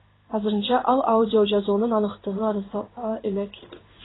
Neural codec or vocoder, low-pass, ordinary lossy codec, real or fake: codec, 16 kHz in and 24 kHz out, 1 kbps, XY-Tokenizer; 7.2 kHz; AAC, 16 kbps; fake